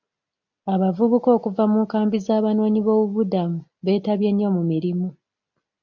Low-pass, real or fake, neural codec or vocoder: 7.2 kHz; real; none